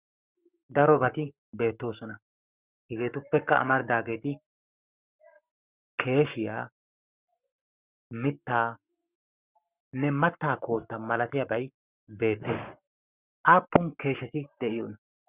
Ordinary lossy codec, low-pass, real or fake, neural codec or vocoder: Opus, 64 kbps; 3.6 kHz; fake; codec, 44.1 kHz, 7.8 kbps, Pupu-Codec